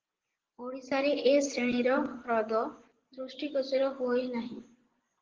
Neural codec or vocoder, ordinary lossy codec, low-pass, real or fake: vocoder, 24 kHz, 100 mel bands, Vocos; Opus, 16 kbps; 7.2 kHz; fake